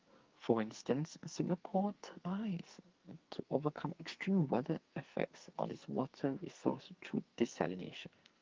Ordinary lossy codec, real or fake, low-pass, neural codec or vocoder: Opus, 32 kbps; fake; 7.2 kHz; codec, 44.1 kHz, 2.6 kbps, SNAC